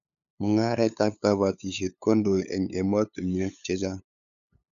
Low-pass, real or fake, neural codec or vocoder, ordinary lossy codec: 7.2 kHz; fake; codec, 16 kHz, 8 kbps, FunCodec, trained on LibriTTS, 25 frames a second; none